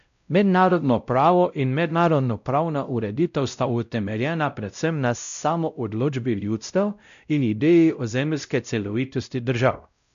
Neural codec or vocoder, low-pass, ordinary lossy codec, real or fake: codec, 16 kHz, 0.5 kbps, X-Codec, WavLM features, trained on Multilingual LibriSpeech; 7.2 kHz; none; fake